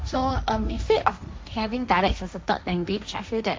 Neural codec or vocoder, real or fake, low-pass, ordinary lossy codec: codec, 16 kHz, 1.1 kbps, Voila-Tokenizer; fake; none; none